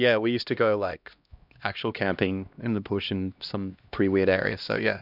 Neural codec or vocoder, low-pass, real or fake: codec, 16 kHz, 1 kbps, X-Codec, HuBERT features, trained on LibriSpeech; 5.4 kHz; fake